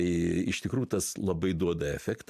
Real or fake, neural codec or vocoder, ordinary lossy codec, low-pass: fake; vocoder, 44.1 kHz, 128 mel bands every 256 samples, BigVGAN v2; MP3, 96 kbps; 14.4 kHz